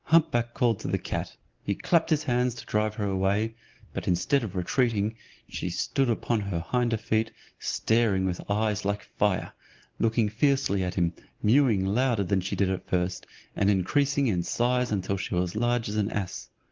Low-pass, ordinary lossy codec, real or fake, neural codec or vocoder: 7.2 kHz; Opus, 16 kbps; real; none